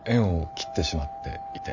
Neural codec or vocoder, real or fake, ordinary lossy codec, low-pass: vocoder, 44.1 kHz, 128 mel bands every 512 samples, BigVGAN v2; fake; none; 7.2 kHz